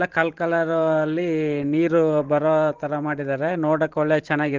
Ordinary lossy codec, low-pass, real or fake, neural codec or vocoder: Opus, 16 kbps; 7.2 kHz; fake; vocoder, 44.1 kHz, 128 mel bands every 512 samples, BigVGAN v2